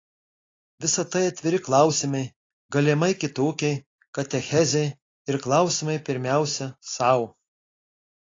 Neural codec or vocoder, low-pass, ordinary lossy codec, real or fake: none; 7.2 kHz; AAC, 32 kbps; real